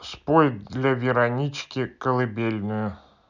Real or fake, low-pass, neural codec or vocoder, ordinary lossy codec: real; 7.2 kHz; none; none